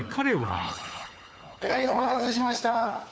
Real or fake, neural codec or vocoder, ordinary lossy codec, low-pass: fake; codec, 16 kHz, 4 kbps, FunCodec, trained on LibriTTS, 50 frames a second; none; none